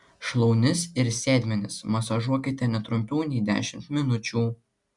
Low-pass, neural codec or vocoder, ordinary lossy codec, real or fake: 10.8 kHz; none; MP3, 96 kbps; real